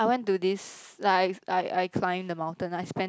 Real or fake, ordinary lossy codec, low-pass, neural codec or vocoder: real; none; none; none